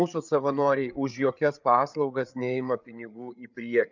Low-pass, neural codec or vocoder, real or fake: 7.2 kHz; codec, 16 kHz, 8 kbps, FreqCodec, larger model; fake